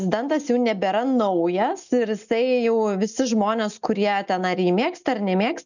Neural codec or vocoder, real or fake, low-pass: none; real; 7.2 kHz